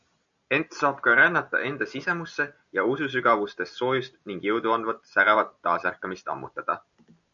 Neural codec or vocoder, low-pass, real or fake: none; 7.2 kHz; real